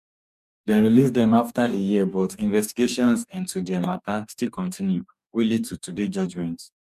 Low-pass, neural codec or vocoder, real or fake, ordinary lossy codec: 14.4 kHz; codec, 44.1 kHz, 2.6 kbps, DAC; fake; none